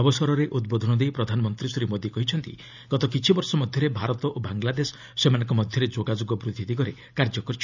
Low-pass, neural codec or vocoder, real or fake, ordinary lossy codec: 7.2 kHz; none; real; none